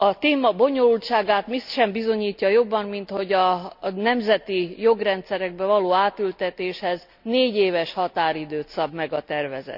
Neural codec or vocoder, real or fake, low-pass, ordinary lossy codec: none; real; 5.4 kHz; none